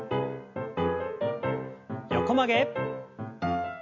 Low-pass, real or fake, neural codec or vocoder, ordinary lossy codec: 7.2 kHz; real; none; MP3, 48 kbps